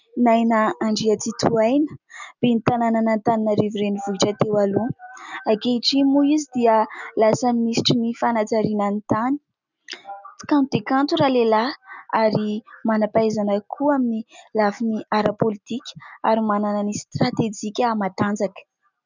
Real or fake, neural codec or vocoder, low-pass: real; none; 7.2 kHz